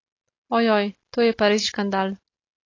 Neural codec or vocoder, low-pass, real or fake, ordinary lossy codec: none; 7.2 kHz; real; AAC, 32 kbps